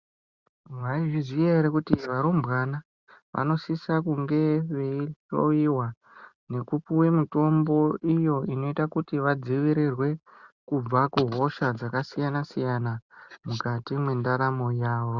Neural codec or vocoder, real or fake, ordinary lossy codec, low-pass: none; real; Opus, 64 kbps; 7.2 kHz